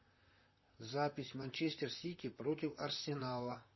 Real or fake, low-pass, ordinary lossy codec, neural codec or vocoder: fake; 7.2 kHz; MP3, 24 kbps; vocoder, 44.1 kHz, 128 mel bands, Pupu-Vocoder